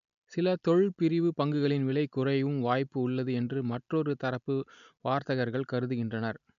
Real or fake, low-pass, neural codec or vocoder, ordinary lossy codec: real; 7.2 kHz; none; none